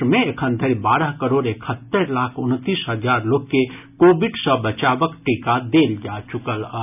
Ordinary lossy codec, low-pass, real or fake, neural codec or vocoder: none; 3.6 kHz; real; none